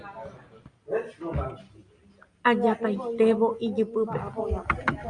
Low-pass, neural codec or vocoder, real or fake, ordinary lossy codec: 9.9 kHz; none; real; Opus, 64 kbps